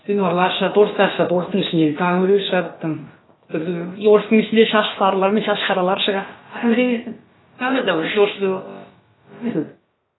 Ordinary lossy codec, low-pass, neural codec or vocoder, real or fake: AAC, 16 kbps; 7.2 kHz; codec, 16 kHz, about 1 kbps, DyCAST, with the encoder's durations; fake